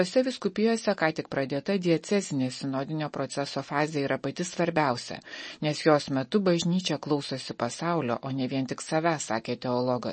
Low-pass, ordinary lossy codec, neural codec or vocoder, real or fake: 10.8 kHz; MP3, 32 kbps; none; real